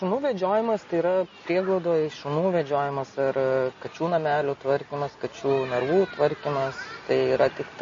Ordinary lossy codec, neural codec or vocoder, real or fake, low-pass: MP3, 32 kbps; none; real; 7.2 kHz